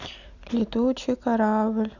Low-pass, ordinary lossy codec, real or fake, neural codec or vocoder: 7.2 kHz; none; real; none